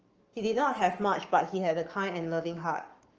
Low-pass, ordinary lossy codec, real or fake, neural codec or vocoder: 7.2 kHz; Opus, 24 kbps; fake; codec, 44.1 kHz, 7.8 kbps, Pupu-Codec